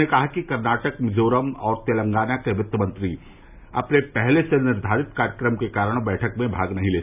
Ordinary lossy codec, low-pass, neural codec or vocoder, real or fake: none; 3.6 kHz; none; real